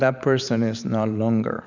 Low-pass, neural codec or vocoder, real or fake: 7.2 kHz; vocoder, 44.1 kHz, 128 mel bands every 512 samples, BigVGAN v2; fake